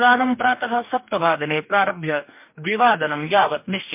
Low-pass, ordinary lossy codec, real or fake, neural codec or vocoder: 3.6 kHz; MP3, 32 kbps; fake; codec, 44.1 kHz, 2.6 kbps, DAC